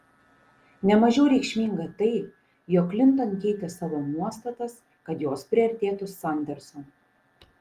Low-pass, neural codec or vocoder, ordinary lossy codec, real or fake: 14.4 kHz; none; Opus, 24 kbps; real